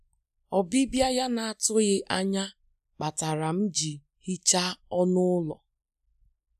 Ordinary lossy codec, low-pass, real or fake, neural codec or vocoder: none; 14.4 kHz; real; none